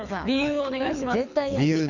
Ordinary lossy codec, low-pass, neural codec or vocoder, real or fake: none; 7.2 kHz; codec, 24 kHz, 6 kbps, HILCodec; fake